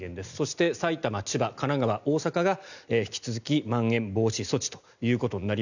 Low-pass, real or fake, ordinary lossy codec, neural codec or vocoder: 7.2 kHz; real; none; none